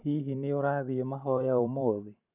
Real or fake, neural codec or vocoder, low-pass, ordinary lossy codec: fake; vocoder, 44.1 kHz, 128 mel bands every 512 samples, BigVGAN v2; 3.6 kHz; none